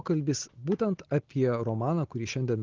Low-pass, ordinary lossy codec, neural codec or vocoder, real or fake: 7.2 kHz; Opus, 16 kbps; none; real